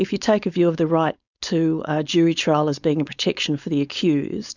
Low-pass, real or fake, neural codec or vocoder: 7.2 kHz; fake; codec, 16 kHz, 4.8 kbps, FACodec